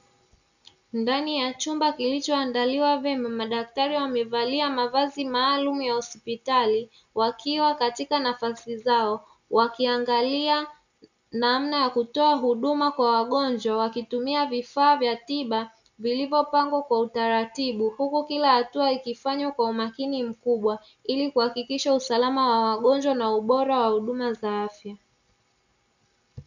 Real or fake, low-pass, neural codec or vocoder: real; 7.2 kHz; none